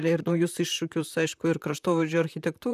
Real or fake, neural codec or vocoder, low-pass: fake; vocoder, 44.1 kHz, 128 mel bands, Pupu-Vocoder; 14.4 kHz